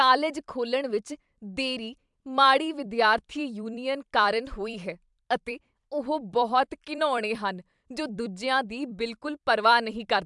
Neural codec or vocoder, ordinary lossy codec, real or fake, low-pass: vocoder, 44.1 kHz, 128 mel bands every 256 samples, BigVGAN v2; MP3, 96 kbps; fake; 10.8 kHz